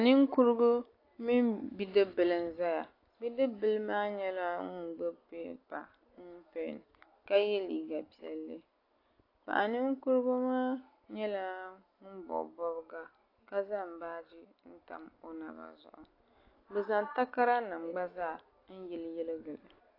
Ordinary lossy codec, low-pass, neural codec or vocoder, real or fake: AAC, 32 kbps; 5.4 kHz; none; real